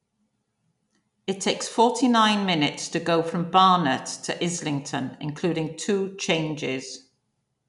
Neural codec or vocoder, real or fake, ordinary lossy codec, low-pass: none; real; none; 10.8 kHz